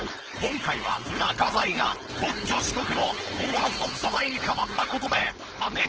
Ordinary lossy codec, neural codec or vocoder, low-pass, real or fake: Opus, 16 kbps; codec, 16 kHz, 4.8 kbps, FACodec; 7.2 kHz; fake